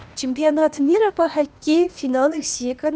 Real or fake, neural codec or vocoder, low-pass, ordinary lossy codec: fake; codec, 16 kHz, 0.8 kbps, ZipCodec; none; none